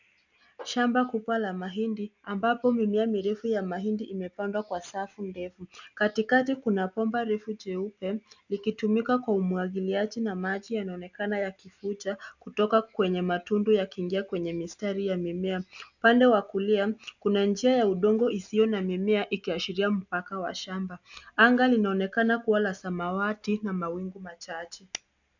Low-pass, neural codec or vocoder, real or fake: 7.2 kHz; none; real